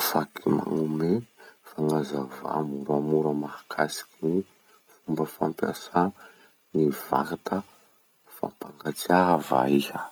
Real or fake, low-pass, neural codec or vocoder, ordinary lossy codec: real; none; none; none